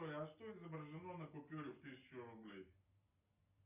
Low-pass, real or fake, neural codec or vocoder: 3.6 kHz; real; none